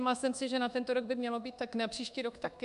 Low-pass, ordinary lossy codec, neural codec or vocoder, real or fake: 10.8 kHz; MP3, 96 kbps; codec, 24 kHz, 1.2 kbps, DualCodec; fake